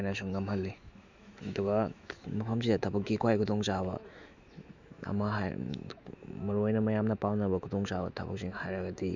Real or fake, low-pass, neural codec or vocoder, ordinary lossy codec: fake; 7.2 kHz; autoencoder, 48 kHz, 128 numbers a frame, DAC-VAE, trained on Japanese speech; Opus, 64 kbps